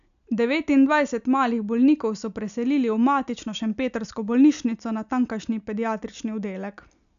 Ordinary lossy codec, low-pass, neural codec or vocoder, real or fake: none; 7.2 kHz; none; real